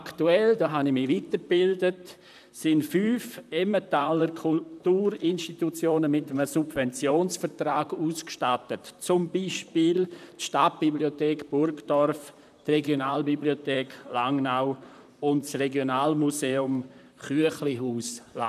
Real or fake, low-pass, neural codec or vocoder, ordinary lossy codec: fake; 14.4 kHz; vocoder, 44.1 kHz, 128 mel bands, Pupu-Vocoder; none